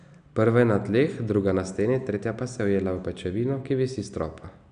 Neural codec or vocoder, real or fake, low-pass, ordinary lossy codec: none; real; 9.9 kHz; none